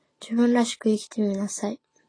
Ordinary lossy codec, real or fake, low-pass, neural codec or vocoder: AAC, 32 kbps; real; 9.9 kHz; none